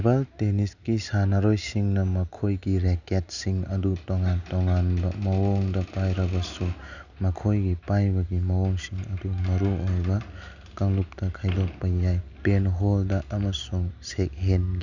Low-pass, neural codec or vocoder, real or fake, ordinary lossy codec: 7.2 kHz; none; real; none